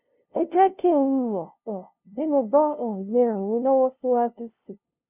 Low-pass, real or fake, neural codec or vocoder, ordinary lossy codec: 3.6 kHz; fake; codec, 16 kHz, 0.5 kbps, FunCodec, trained on LibriTTS, 25 frames a second; none